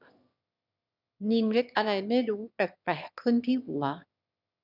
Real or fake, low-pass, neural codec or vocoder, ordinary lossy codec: fake; 5.4 kHz; autoencoder, 22.05 kHz, a latent of 192 numbers a frame, VITS, trained on one speaker; none